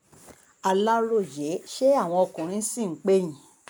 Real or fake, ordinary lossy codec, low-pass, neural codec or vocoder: real; none; none; none